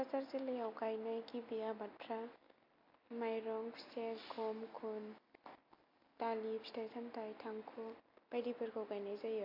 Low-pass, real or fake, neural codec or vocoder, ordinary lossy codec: 5.4 kHz; real; none; MP3, 32 kbps